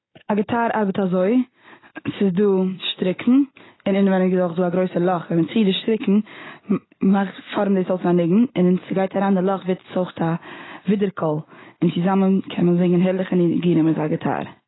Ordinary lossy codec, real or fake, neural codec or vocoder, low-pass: AAC, 16 kbps; real; none; 7.2 kHz